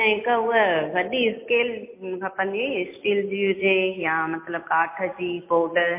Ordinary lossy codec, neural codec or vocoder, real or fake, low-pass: MP3, 24 kbps; none; real; 3.6 kHz